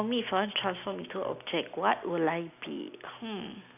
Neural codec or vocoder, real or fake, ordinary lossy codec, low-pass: none; real; none; 3.6 kHz